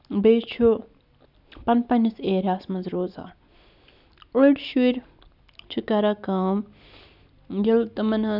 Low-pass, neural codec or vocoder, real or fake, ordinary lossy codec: 5.4 kHz; none; real; none